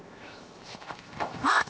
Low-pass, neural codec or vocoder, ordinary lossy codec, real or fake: none; codec, 16 kHz, 0.7 kbps, FocalCodec; none; fake